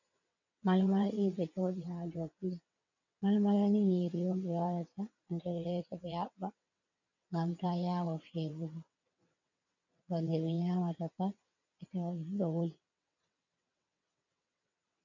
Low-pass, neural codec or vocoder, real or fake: 7.2 kHz; vocoder, 22.05 kHz, 80 mel bands, WaveNeXt; fake